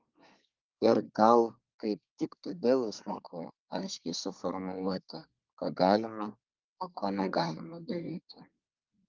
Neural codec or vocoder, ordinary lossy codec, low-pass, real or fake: codec, 24 kHz, 1 kbps, SNAC; Opus, 24 kbps; 7.2 kHz; fake